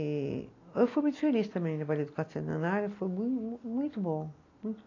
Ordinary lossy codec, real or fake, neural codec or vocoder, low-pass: AAC, 32 kbps; real; none; 7.2 kHz